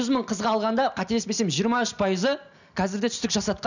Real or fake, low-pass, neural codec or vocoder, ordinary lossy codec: real; 7.2 kHz; none; none